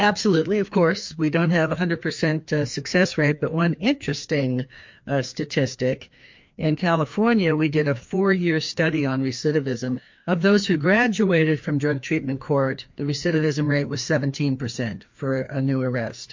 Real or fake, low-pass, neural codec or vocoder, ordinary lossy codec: fake; 7.2 kHz; codec, 16 kHz, 2 kbps, FreqCodec, larger model; MP3, 48 kbps